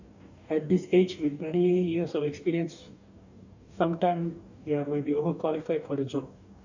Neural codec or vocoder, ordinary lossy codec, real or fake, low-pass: codec, 44.1 kHz, 2.6 kbps, DAC; none; fake; 7.2 kHz